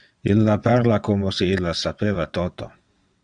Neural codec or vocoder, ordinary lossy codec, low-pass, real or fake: vocoder, 22.05 kHz, 80 mel bands, WaveNeXt; Opus, 64 kbps; 9.9 kHz; fake